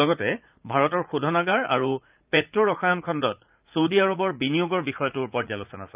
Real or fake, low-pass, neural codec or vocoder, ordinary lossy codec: fake; 3.6 kHz; vocoder, 44.1 kHz, 80 mel bands, Vocos; Opus, 24 kbps